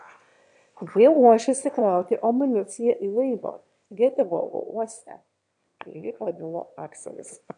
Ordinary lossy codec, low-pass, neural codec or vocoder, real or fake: AAC, 64 kbps; 9.9 kHz; autoencoder, 22.05 kHz, a latent of 192 numbers a frame, VITS, trained on one speaker; fake